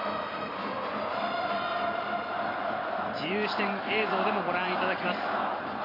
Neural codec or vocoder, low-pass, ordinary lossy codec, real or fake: vocoder, 44.1 kHz, 128 mel bands every 256 samples, BigVGAN v2; 5.4 kHz; AAC, 24 kbps; fake